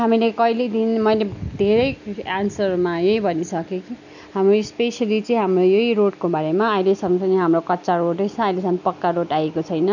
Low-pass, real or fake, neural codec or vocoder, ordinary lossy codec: 7.2 kHz; real; none; none